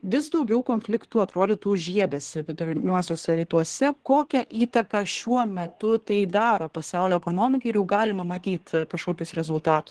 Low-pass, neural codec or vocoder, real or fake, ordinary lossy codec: 10.8 kHz; codec, 24 kHz, 1 kbps, SNAC; fake; Opus, 16 kbps